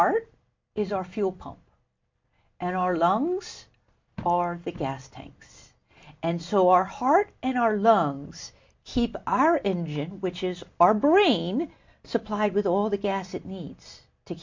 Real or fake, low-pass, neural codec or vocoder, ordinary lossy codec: real; 7.2 kHz; none; MP3, 48 kbps